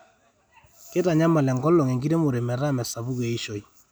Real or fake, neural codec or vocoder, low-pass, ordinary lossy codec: real; none; none; none